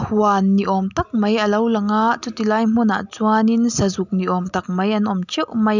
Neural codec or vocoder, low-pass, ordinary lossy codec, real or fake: none; 7.2 kHz; none; real